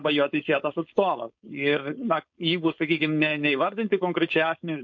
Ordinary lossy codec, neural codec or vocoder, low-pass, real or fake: MP3, 48 kbps; codec, 16 kHz, 4.8 kbps, FACodec; 7.2 kHz; fake